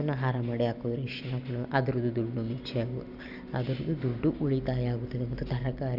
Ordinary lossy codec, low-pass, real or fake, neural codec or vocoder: none; 5.4 kHz; real; none